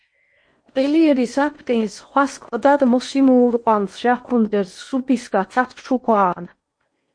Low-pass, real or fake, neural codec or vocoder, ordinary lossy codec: 9.9 kHz; fake; codec, 16 kHz in and 24 kHz out, 0.8 kbps, FocalCodec, streaming, 65536 codes; MP3, 48 kbps